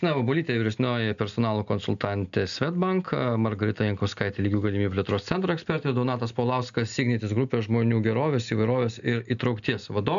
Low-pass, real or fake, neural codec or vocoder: 7.2 kHz; real; none